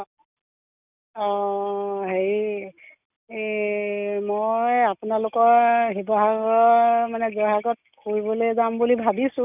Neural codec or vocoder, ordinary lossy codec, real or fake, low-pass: none; none; real; 3.6 kHz